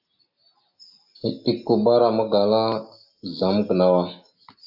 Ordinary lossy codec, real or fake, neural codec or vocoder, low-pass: AAC, 48 kbps; real; none; 5.4 kHz